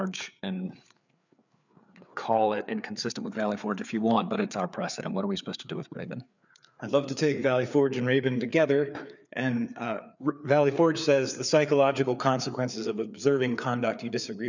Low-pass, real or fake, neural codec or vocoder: 7.2 kHz; fake; codec, 16 kHz, 4 kbps, FreqCodec, larger model